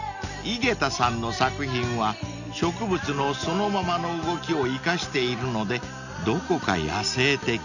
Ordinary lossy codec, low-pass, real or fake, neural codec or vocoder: none; 7.2 kHz; real; none